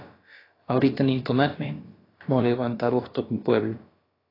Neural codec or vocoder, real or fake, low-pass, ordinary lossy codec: codec, 16 kHz, about 1 kbps, DyCAST, with the encoder's durations; fake; 5.4 kHz; AAC, 24 kbps